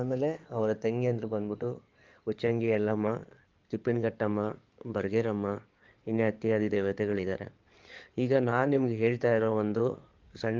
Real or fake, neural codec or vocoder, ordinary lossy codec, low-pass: fake; codec, 16 kHz in and 24 kHz out, 2.2 kbps, FireRedTTS-2 codec; Opus, 24 kbps; 7.2 kHz